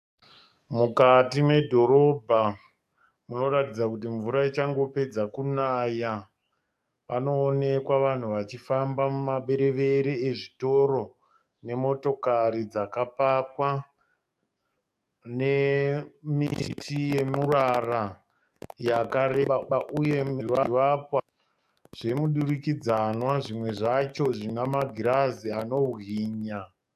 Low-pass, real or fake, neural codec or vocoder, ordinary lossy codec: 14.4 kHz; fake; codec, 44.1 kHz, 7.8 kbps, DAC; AAC, 96 kbps